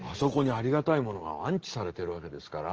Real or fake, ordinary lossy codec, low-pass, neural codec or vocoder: real; Opus, 16 kbps; 7.2 kHz; none